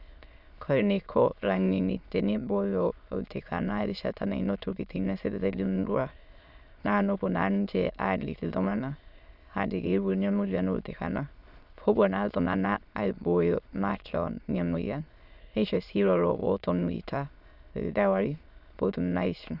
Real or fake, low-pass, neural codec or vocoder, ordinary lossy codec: fake; 5.4 kHz; autoencoder, 22.05 kHz, a latent of 192 numbers a frame, VITS, trained on many speakers; none